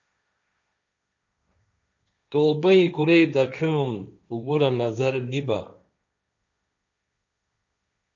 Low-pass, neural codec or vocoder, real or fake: 7.2 kHz; codec, 16 kHz, 1.1 kbps, Voila-Tokenizer; fake